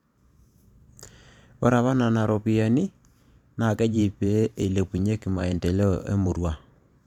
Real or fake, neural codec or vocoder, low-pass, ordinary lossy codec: fake; vocoder, 44.1 kHz, 128 mel bands every 512 samples, BigVGAN v2; 19.8 kHz; none